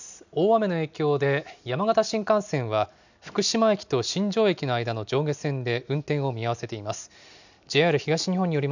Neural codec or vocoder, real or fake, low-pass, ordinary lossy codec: none; real; 7.2 kHz; none